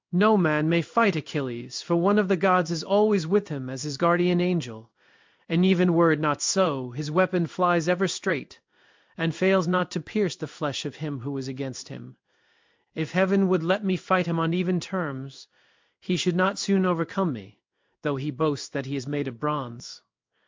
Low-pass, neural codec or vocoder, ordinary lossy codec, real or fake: 7.2 kHz; codec, 16 kHz in and 24 kHz out, 1 kbps, XY-Tokenizer; MP3, 64 kbps; fake